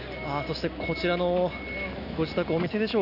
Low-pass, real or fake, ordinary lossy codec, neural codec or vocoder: 5.4 kHz; real; none; none